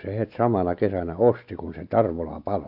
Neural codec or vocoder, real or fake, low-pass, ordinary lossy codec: none; real; 5.4 kHz; AAC, 48 kbps